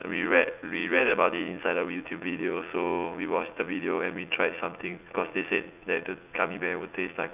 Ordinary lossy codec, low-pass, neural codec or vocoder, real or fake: none; 3.6 kHz; vocoder, 44.1 kHz, 80 mel bands, Vocos; fake